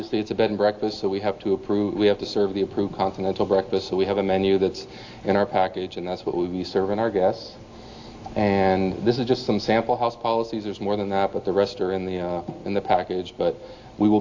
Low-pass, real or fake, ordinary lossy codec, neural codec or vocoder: 7.2 kHz; real; AAC, 48 kbps; none